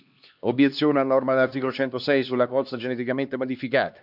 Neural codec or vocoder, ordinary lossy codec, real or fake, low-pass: codec, 16 kHz, 2 kbps, X-Codec, HuBERT features, trained on LibriSpeech; none; fake; 5.4 kHz